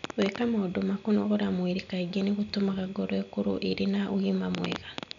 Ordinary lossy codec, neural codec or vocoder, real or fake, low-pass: none; none; real; 7.2 kHz